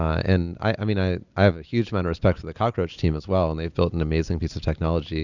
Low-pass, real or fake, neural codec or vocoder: 7.2 kHz; real; none